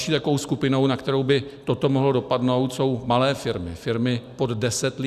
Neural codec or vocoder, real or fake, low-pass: none; real; 14.4 kHz